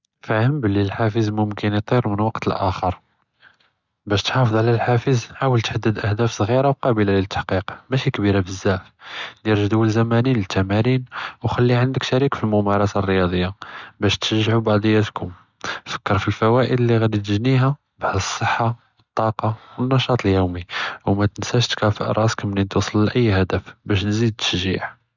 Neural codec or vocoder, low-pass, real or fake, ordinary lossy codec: none; 7.2 kHz; real; none